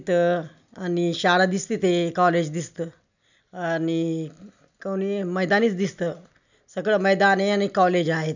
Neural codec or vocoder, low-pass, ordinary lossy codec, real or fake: none; 7.2 kHz; none; real